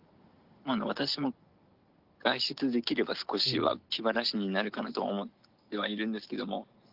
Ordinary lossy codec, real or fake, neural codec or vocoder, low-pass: Opus, 32 kbps; real; none; 5.4 kHz